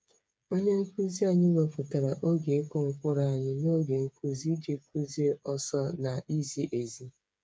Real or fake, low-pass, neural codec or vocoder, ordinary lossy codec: fake; none; codec, 16 kHz, 8 kbps, FreqCodec, smaller model; none